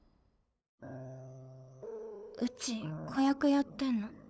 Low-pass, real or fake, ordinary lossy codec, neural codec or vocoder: none; fake; none; codec, 16 kHz, 8 kbps, FunCodec, trained on LibriTTS, 25 frames a second